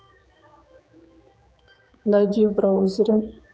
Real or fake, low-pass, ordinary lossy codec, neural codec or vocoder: fake; none; none; codec, 16 kHz, 4 kbps, X-Codec, HuBERT features, trained on general audio